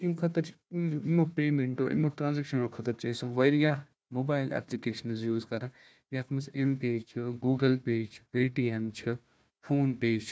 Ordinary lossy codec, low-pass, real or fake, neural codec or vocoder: none; none; fake; codec, 16 kHz, 1 kbps, FunCodec, trained on Chinese and English, 50 frames a second